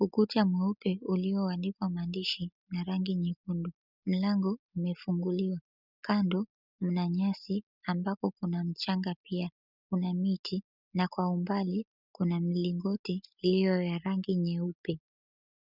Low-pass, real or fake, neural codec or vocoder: 5.4 kHz; real; none